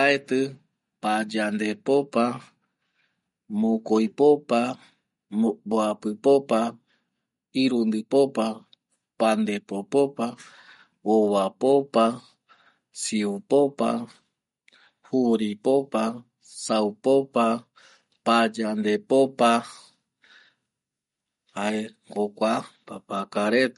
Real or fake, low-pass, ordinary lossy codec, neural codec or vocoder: real; 19.8 kHz; MP3, 48 kbps; none